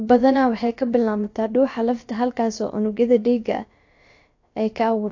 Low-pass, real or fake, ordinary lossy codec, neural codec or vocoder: 7.2 kHz; fake; AAC, 48 kbps; codec, 16 kHz, about 1 kbps, DyCAST, with the encoder's durations